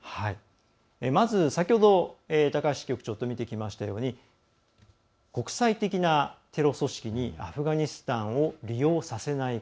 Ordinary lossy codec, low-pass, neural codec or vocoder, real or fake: none; none; none; real